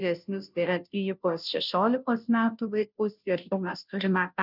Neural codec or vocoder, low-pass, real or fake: codec, 16 kHz, 0.5 kbps, FunCodec, trained on Chinese and English, 25 frames a second; 5.4 kHz; fake